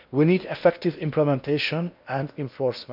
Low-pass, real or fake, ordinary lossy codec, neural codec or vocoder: 5.4 kHz; fake; none; codec, 16 kHz in and 24 kHz out, 0.8 kbps, FocalCodec, streaming, 65536 codes